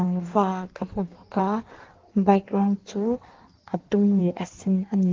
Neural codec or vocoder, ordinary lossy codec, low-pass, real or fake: codec, 16 kHz in and 24 kHz out, 1.1 kbps, FireRedTTS-2 codec; Opus, 16 kbps; 7.2 kHz; fake